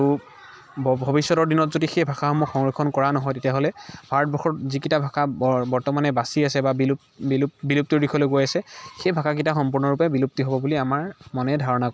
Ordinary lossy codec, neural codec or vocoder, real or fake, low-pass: none; none; real; none